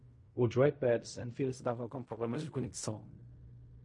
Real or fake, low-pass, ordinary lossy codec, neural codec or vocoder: fake; 10.8 kHz; MP3, 48 kbps; codec, 16 kHz in and 24 kHz out, 0.4 kbps, LongCat-Audio-Codec, fine tuned four codebook decoder